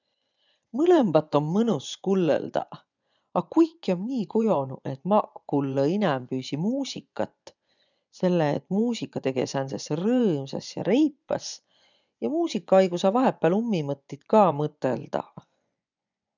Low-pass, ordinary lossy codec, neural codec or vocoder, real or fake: 7.2 kHz; none; vocoder, 24 kHz, 100 mel bands, Vocos; fake